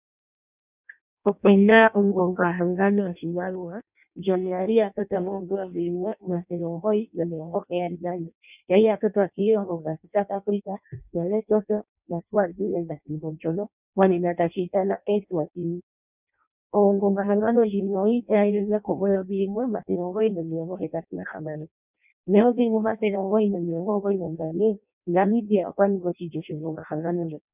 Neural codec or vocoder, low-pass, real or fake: codec, 16 kHz in and 24 kHz out, 0.6 kbps, FireRedTTS-2 codec; 3.6 kHz; fake